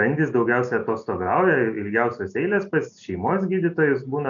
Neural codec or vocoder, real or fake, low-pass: none; real; 7.2 kHz